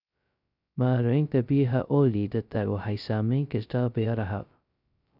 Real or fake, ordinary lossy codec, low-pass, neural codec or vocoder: fake; none; 5.4 kHz; codec, 16 kHz, 0.2 kbps, FocalCodec